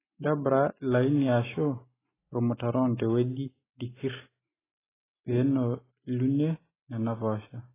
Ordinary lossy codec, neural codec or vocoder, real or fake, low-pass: AAC, 16 kbps; none; real; 3.6 kHz